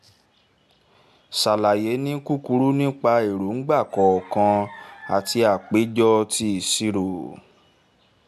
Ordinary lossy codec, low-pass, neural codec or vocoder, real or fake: AAC, 96 kbps; 14.4 kHz; none; real